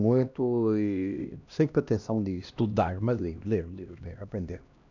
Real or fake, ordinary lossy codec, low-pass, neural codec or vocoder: fake; none; 7.2 kHz; codec, 16 kHz, 1 kbps, X-Codec, HuBERT features, trained on LibriSpeech